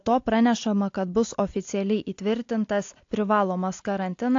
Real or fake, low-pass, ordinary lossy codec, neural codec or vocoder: real; 7.2 kHz; AAC, 48 kbps; none